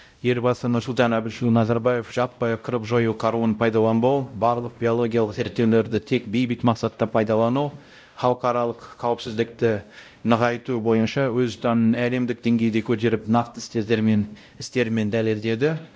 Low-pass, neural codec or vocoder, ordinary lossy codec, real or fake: none; codec, 16 kHz, 0.5 kbps, X-Codec, WavLM features, trained on Multilingual LibriSpeech; none; fake